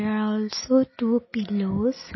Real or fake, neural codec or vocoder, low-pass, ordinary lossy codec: real; none; 7.2 kHz; MP3, 24 kbps